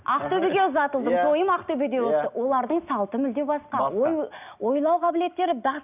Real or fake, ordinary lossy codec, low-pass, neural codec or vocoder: real; none; 3.6 kHz; none